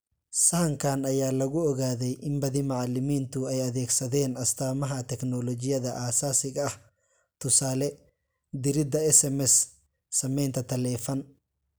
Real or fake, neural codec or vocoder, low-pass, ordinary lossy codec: real; none; none; none